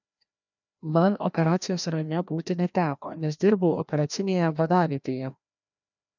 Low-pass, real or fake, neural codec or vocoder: 7.2 kHz; fake; codec, 16 kHz, 1 kbps, FreqCodec, larger model